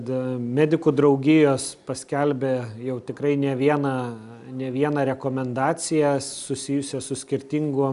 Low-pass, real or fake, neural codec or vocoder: 10.8 kHz; real; none